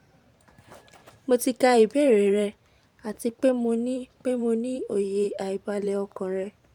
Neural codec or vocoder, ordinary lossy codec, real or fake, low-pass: vocoder, 44.1 kHz, 128 mel bands every 512 samples, BigVGAN v2; none; fake; 19.8 kHz